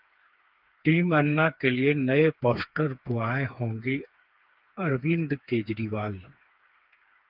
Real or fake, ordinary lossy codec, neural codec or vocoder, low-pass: fake; Opus, 32 kbps; codec, 16 kHz, 4 kbps, FreqCodec, smaller model; 5.4 kHz